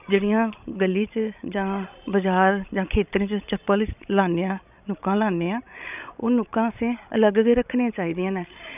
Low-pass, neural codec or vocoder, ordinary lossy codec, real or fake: 3.6 kHz; codec, 16 kHz, 16 kbps, FreqCodec, larger model; none; fake